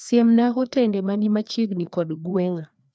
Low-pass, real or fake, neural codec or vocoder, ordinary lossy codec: none; fake; codec, 16 kHz, 2 kbps, FreqCodec, larger model; none